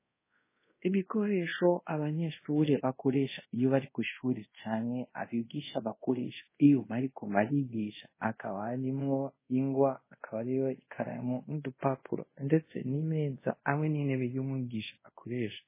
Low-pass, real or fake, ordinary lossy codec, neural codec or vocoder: 3.6 kHz; fake; MP3, 16 kbps; codec, 24 kHz, 0.5 kbps, DualCodec